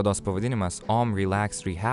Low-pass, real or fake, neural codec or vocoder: 10.8 kHz; real; none